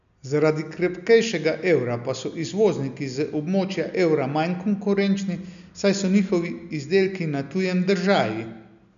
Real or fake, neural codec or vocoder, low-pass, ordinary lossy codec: real; none; 7.2 kHz; none